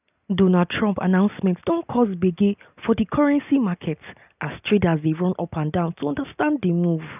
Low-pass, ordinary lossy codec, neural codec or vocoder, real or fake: 3.6 kHz; none; none; real